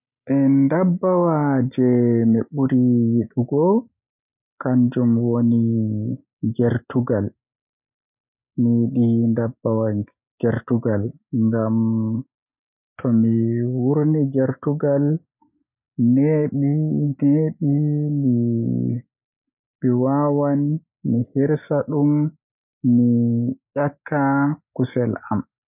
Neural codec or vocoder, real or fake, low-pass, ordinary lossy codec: none; real; 3.6 kHz; none